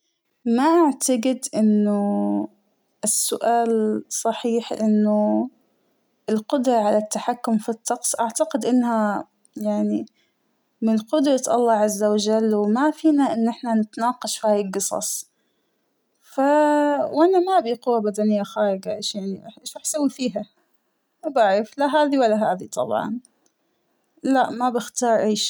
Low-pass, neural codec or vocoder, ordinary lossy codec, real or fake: none; none; none; real